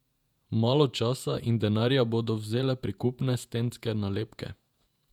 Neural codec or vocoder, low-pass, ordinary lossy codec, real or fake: vocoder, 48 kHz, 128 mel bands, Vocos; 19.8 kHz; none; fake